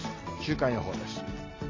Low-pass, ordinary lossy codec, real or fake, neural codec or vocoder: 7.2 kHz; AAC, 32 kbps; real; none